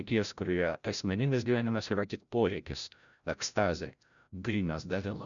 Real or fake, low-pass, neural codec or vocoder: fake; 7.2 kHz; codec, 16 kHz, 0.5 kbps, FreqCodec, larger model